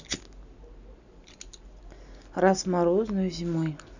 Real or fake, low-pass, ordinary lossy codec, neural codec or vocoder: real; 7.2 kHz; none; none